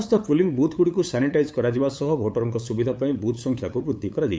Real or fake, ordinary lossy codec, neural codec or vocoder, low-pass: fake; none; codec, 16 kHz, 8 kbps, FunCodec, trained on LibriTTS, 25 frames a second; none